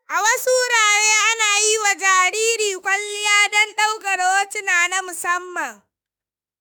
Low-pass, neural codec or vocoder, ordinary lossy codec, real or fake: none; autoencoder, 48 kHz, 32 numbers a frame, DAC-VAE, trained on Japanese speech; none; fake